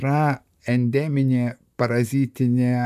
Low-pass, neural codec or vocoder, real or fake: 14.4 kHz; vocoder, 44.1 kHz, 128 mel bands, Pupu-Vocoder; fake